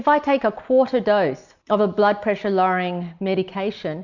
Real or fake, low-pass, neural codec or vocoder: real; 7.2 kHz; none